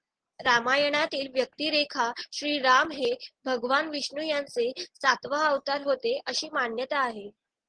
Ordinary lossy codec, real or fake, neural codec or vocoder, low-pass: Opus, 16 kbps; real; none; 9.9 kHz